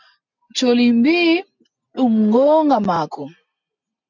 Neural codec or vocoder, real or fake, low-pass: none; real; 7.2 kHz